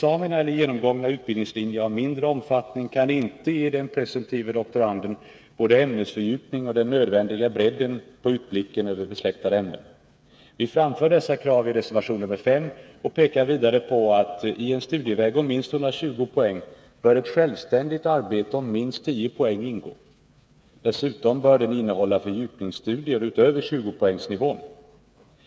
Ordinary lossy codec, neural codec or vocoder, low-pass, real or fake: none; codec, 16 kHz, 8 kbps, FreqCodec, smaller model; none; fake